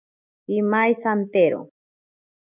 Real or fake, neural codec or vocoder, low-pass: real; none; 3.6 kHz